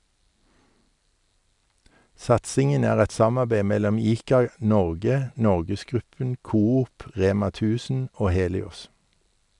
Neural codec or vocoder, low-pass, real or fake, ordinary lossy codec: none; 10.8 kHz; real; none